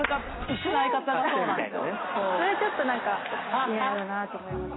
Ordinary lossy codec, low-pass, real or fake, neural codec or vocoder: AAC, 16 kbps; 7.2 kHz; real; none